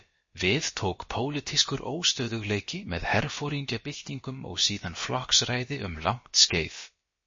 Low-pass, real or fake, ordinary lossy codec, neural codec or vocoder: 7.2 kHz; fake; MP3, 32 kbps; codec, 16 kHz, about 1 kbps, DyCAST, with the encoder's durations